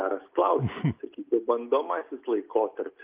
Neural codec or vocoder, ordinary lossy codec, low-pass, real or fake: none; Opus, 24 kbps; 3.6 kHz; real